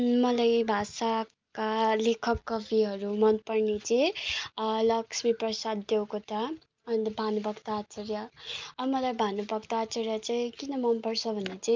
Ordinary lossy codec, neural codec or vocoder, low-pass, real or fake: Opus, 24 kbps; none; 7.2 kHz; real